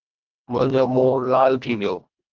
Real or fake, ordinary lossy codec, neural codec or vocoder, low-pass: fake; Opus, 24 kbps; codec, 24 kHz, 1.5 kbps, HILCodec; 7.2 kHz